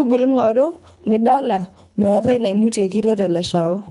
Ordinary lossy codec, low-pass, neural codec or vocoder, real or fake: none; 10.8 kHz; codec, 24 kHz, 1.5 kbps, HILCodec; fake